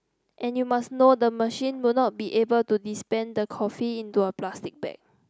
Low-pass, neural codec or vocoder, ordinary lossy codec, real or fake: none; none; none; real